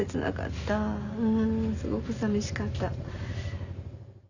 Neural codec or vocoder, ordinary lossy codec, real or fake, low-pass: none; none; real; 7.2 kHz